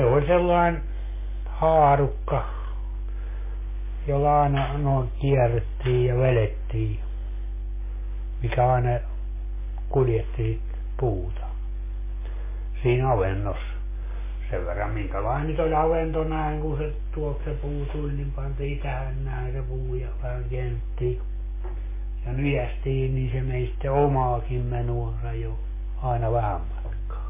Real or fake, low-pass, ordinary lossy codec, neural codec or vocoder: real; 3.6 kHz; MP3, 16 kbps; none